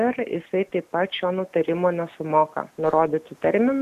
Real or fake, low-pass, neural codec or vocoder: real; 14.4 kHz; none